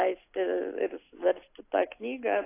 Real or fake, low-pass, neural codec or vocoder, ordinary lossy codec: real; 3.6 kHz; none; AAC, 24 kbps